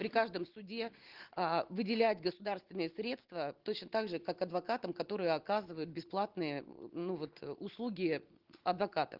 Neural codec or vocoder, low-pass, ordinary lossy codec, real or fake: none; 5.4 kHz; Opus, 16 kbps; real